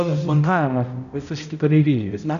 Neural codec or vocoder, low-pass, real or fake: codec, 16 kHz, 0.5 kbps, X-Codec, HuBERT features, trained on balanced general audio; 7.2 kHz; fake